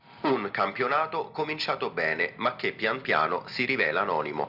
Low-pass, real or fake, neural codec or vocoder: 5.4 kHz; real; none